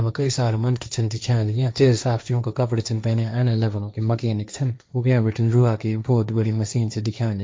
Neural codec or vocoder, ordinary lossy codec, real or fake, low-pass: codec, 16 kHz, 1.1 kbps, Voila-Tokenizer; none; fake; none